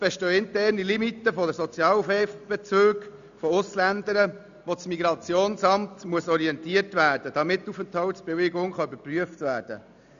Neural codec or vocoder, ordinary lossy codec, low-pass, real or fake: none; none; 7.2 kHz; real